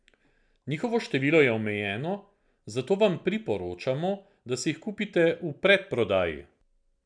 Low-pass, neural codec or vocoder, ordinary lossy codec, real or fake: 9.9 kHz; none; none; real